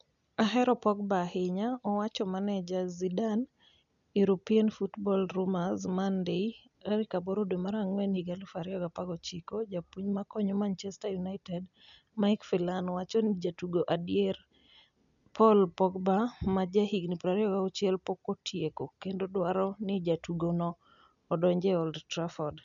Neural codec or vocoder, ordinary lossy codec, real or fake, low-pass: none; none; real; 7.2 kHz